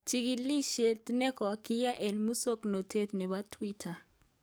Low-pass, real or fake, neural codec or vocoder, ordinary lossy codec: none; fake; codec, 44.1 kHz, 7.8 kbps, DAC; none